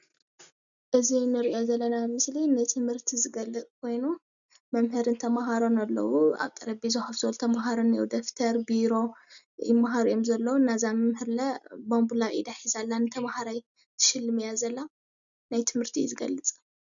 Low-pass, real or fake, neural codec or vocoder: 7.2 kHz; real; none